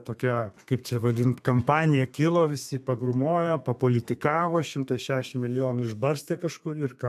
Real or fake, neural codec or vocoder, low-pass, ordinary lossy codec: fake; codec, 32 kHz, 1.9 kbps, SNAC; 14.4 kHz; AAC, 96 kbps